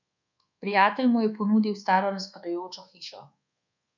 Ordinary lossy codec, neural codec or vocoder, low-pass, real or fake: none; codec, 24 kHz, 1.2 kbps, DualCodec; 7.2 kHz; fake